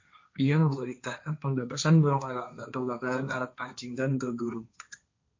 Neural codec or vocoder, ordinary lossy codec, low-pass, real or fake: codec, 16 kHz, 1.1 kbps, Voila-Tokenizer; MP3, 48 kbps; 7.2 kHz; fake